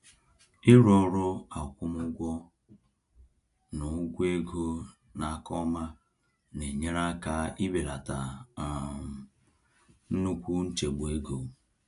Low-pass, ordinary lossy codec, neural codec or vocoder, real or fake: 10.8 kHz; none; none; real